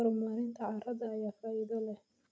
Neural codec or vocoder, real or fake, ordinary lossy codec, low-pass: none; real; none; none